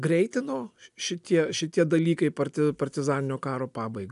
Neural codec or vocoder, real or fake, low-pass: none; real; 10.8 kHz